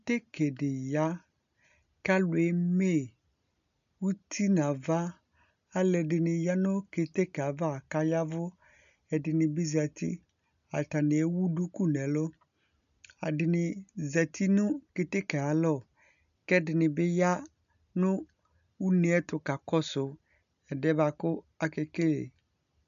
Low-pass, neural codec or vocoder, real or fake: 7.2 kHz; none; real